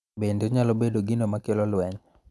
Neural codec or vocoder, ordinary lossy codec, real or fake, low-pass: none; none; real; none